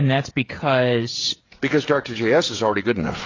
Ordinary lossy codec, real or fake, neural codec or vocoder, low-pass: AAC, 32 kbps; fake; codec, 16 kHz, 8 kbps, FreqCodec, smaller model; 7.2 kHz